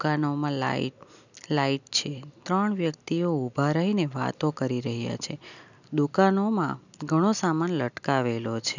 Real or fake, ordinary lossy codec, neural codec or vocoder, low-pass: real; none; none; 7.2 kHz